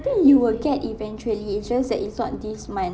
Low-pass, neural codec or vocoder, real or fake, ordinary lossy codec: none; none; real; none